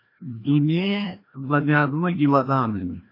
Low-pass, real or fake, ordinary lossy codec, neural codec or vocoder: 5.4 kHz; fake; AAC, 32 kbps; codec, 16 kHz, 1 kbps, FreqCodec, larger model